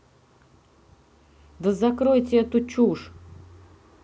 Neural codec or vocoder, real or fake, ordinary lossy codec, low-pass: none; real; none; none